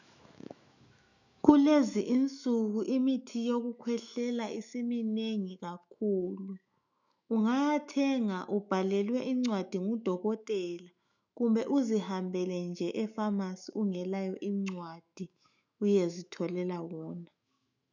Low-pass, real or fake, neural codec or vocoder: 7.2 kHz; fake; autoencoder, 48 kHz, 128 numbers a frame, DAC-VAE, trained on Japanese speech